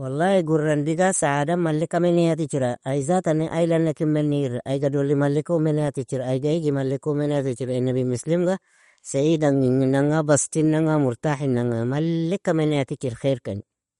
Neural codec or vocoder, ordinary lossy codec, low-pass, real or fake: none; MP3, 48 kbps; 19.8 kHz; real